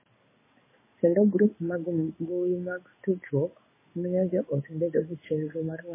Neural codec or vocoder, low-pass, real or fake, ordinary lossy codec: codec, 16 kHz in and 24 kHz out, 1 kbps, XY-Tokenizer; 3.6 kHz; fake; MP3, 16 kbps